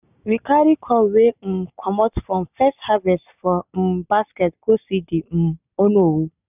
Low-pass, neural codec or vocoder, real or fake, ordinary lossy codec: 3.6 kHz; none; real; none